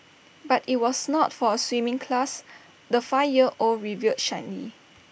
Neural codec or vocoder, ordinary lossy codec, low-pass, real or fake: none; none; none; real